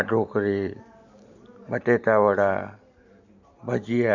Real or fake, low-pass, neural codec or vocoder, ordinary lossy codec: real; 7.2 kHz; none; none